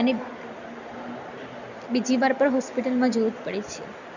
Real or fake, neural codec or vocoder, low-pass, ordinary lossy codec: real; none; 7.2 kHz; none